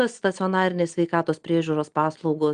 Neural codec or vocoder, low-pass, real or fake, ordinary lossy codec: none; 9.9 kHz; real; Opus, 32 kbps